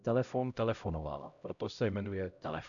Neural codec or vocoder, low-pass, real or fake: codec, 16 kHz, 0.5 kbps, X-Codec, HuBERT features, trained on LibriSpeech; 7.2 kHz; fake